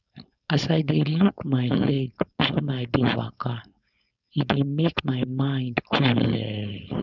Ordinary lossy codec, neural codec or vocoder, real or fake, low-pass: none; codec, 16 kHz, 4.8 kbps, FACodec; fake; 7.2 kHz